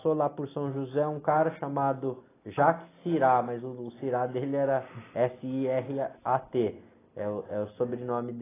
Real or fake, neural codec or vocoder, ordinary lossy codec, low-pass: real; none; AAC, 16 kbps; 3.6 kHz